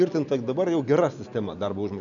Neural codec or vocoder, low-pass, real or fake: none; 7.2 kHz; real